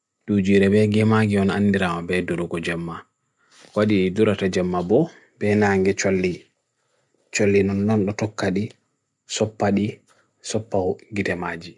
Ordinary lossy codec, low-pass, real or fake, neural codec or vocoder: none; 10.8 kHz; real; none